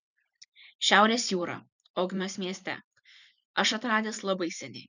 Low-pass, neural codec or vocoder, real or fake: 7.2 kHz; vocoder, 44.1 kHz, 128 mel bands every 512 samples, BigVGAN v2; fake